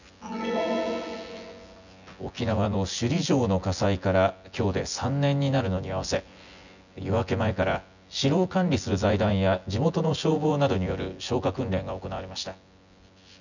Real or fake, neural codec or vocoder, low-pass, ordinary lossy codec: fake; vocoder, 24 kHz, 100 mel bands, Vocos; 7.2 kHz; none